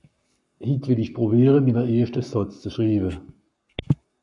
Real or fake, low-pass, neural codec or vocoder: fake; 10.8 kHz; codec, 44.1 kHz, 7.8 kbps, Pupu-Codec